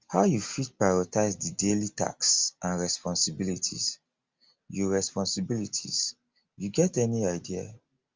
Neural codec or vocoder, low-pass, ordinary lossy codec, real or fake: none; 7.2 kHz; Opus, 32 kbps; real